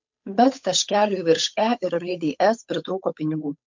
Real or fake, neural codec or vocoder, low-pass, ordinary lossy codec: fake; codec, 16 kHz, 8 kbps, FunCodec, trained on Chinese and English, 25 frames a second; 7.2 kHz; MP3, 64 kbps